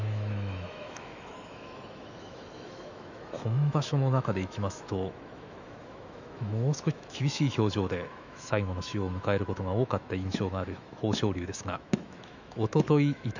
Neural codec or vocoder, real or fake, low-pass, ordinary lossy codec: autoencoder, 48 kHz, 128 numbers a frame, DAC-VAE, trained on Japanese speech; fake; 7.2 kHz; none